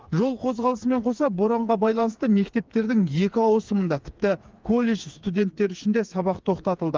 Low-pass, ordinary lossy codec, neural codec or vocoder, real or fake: 7.2 kHz; Opus, 32 kbps; codec, 16 kHz, 4 kbps, FreqCodec, smaller model; fake